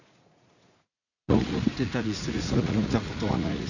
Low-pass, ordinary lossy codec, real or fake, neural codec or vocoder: 7.2 kHz; none; fake; vocoder, 44.1 kHz, 80 mel bands, Vocos